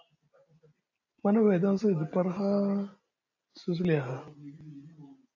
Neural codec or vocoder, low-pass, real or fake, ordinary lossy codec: none; 7.2 kHz; real; AAC, 48 kbps